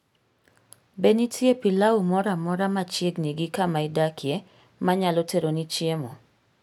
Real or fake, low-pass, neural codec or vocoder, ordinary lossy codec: real; 19.8 kHz; none; none